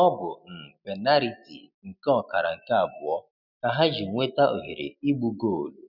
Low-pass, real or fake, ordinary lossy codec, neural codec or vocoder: 5.4 kHz; real; none; none